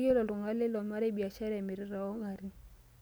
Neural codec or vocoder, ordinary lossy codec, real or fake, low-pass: none; none; real; none